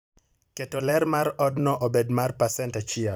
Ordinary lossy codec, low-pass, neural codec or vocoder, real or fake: none; none; vocoder, 44.1 kHz, 128 mel bands every 256 samples, BigVGAN v2; fake